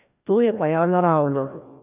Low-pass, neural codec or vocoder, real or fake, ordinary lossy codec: 3.6 kHz; codec, 16 kHz, 1 kbps, FreqCodec, larger model; fake; none